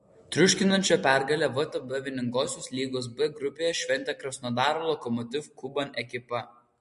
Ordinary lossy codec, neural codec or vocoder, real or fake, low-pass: MP3, 48 kbps; none; real; 14.4 kHz